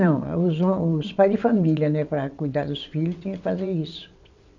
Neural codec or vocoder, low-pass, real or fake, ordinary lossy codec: vocoder, 22.05 kHz, 80 mel bands, WaveNeXt; 7.2 kHz; fake; none